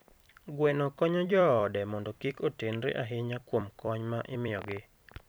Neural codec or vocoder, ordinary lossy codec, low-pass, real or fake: vocoder, 44.1 kHz, 128 mel bands every 256 samples, BigVGAN v2; none; none; fake